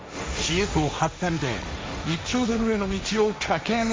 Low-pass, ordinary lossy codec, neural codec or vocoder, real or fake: none; none; codec, 16 kHz, 1.1 kbps, Voila-Tokenizer; fake